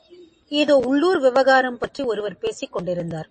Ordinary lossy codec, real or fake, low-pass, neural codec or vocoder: MP3, 32 kbps; real; 10.8 kHz; none